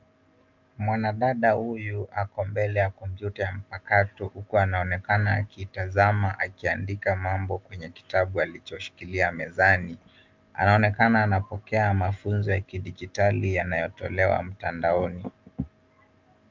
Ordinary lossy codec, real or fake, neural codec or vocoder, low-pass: Opus, 24 kbps; real; none; 7.2 kHz